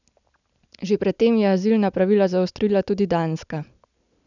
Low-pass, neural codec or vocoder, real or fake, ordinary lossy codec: 7.2 kHz; none; real; none